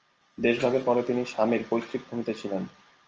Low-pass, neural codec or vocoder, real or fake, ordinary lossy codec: 7.2 kHz; none; real; Opus, 24 kbps